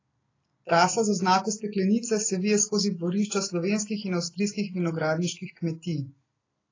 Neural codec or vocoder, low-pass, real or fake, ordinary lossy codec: none; 7.2 kHz; real; AAC, 32 kbps